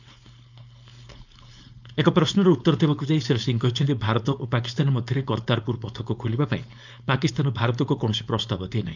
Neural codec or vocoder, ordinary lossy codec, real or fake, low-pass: codec, 16 kHz, 4.8 kbps, FACodec; none; fake; 7.2 kHz